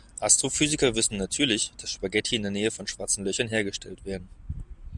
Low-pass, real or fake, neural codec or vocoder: 10.8 kHz; real; none